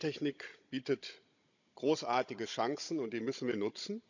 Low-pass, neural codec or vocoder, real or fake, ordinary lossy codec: 7.2 kHz; codec, 16 kHz, 16 kbps, FunCodec, trained on Chinese and English, 50 frames a second; fake; none